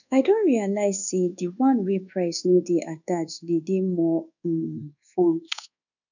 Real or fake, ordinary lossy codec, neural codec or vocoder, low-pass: fake; none; codec, 24 kHz, 1.2 kbps, DualCodec; 7.2 kHz